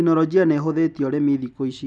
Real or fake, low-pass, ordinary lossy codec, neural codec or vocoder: real; none; none; none